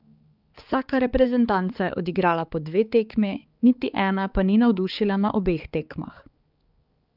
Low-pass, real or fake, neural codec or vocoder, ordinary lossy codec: 5.4 kHz; fake; codec, 16 kHz, 4 kbps, X-Codec, HuBERT features, trained on balanced general audio; Opus, 24 kbps